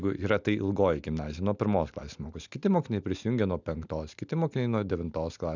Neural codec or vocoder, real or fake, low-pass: none; real; 7.2 kHz